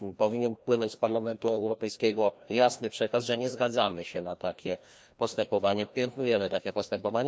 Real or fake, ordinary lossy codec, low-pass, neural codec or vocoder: fake; none; none; codec, 16 kHz, 1 kbps, FreqCodec, larger model